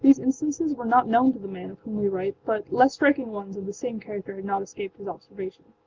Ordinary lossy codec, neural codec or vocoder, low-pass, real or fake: Opus, 32 kbps; none; 7.2 kHz; real